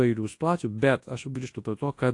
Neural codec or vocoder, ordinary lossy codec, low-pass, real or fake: codec, 24 kHz, 0.9 kbps, WavTokenizer, large speech release; AAC, 48 kbps; 10.8 kHz; fake